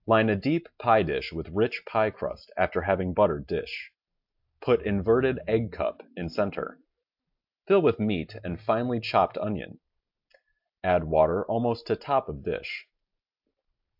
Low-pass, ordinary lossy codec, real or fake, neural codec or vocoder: 5.4 kHz; AAC, 48 kbps; real; none